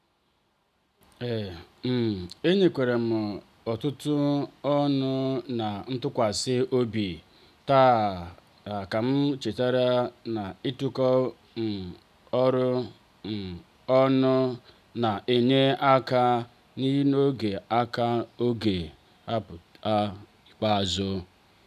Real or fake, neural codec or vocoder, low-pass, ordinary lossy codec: real; none; 14.4 kHz; none